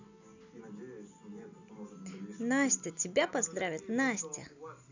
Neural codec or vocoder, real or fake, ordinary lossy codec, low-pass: none; real; none; 7.2 kHz